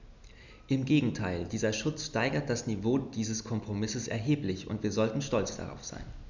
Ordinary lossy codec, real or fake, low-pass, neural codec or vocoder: none; real; 7.2 kHz; none